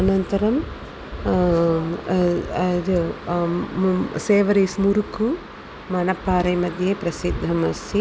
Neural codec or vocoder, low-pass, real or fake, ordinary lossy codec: none; none; real; none